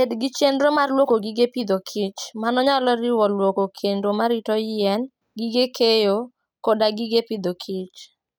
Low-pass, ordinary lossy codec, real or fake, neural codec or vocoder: none; none; real; none